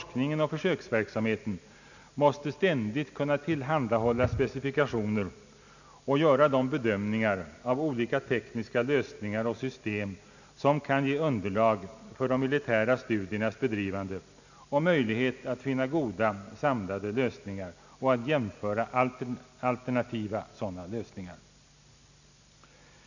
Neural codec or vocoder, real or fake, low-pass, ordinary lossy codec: none; real; 7.2 kHz; none